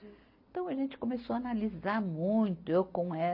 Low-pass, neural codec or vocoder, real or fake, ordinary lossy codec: 5.4 kHz; none; real; MP3, 32 kbps